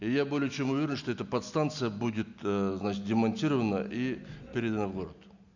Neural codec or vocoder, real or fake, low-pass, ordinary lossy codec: none; real; 7.2 kHz; none